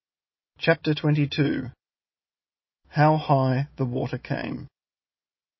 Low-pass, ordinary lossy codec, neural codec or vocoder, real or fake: 7.2 kHz; MP3, 24 kbps; none; real